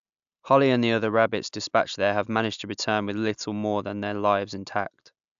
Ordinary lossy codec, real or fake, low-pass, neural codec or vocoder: AAC, 96 kbps; real; 7.2 kHz; none